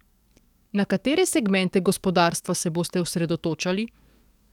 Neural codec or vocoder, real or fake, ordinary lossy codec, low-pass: codec, 44.1 kHz, 7.8 kbps, DAC; fake; none; 19.8 kHz